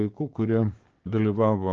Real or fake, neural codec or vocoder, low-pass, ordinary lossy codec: fake; codec, 16 kHz, 6 kbps, DAC; 7.2 kHz; Opus, 16 kbps